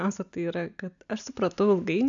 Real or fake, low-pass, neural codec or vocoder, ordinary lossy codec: real; 7.2 kHz; none; MP3, 96 kbps